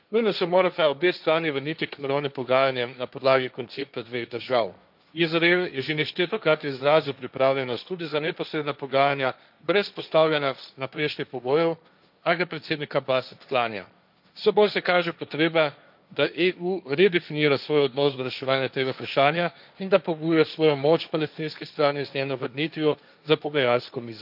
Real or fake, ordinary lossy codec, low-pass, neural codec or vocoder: fake; none; 5.4 kHz; codec, 16 kHz, 1.1 kbps, Voila-Tokenizer